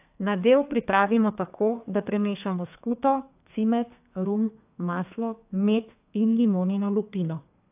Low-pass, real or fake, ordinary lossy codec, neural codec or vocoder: 3.6 kHz; fake; AAC, 32 kbps; codec, 32 kHz, 1.9 kbps, SNAC